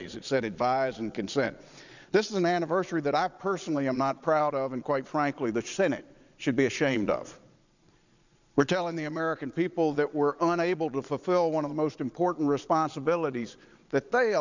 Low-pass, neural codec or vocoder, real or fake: 7.2 kHz; vocoder, 22.05 kHz, 80 mel bands, Vocos; fake